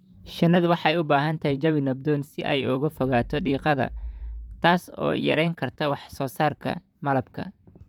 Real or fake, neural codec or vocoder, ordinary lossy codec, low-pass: fake; vocoder, 44.1 kHz, 128 mel bands, Pupu-Vocoder; none; 19.8 kHz